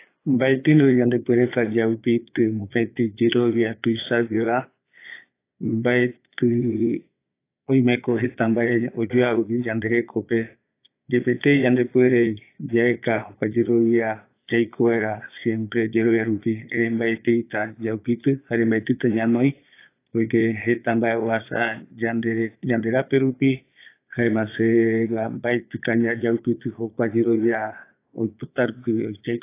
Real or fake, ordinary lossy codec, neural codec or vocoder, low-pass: fake; AAC, 24 kbps; vocoder, 22.05 kHz, 80 mel bands, Vocos; 3.6 kHz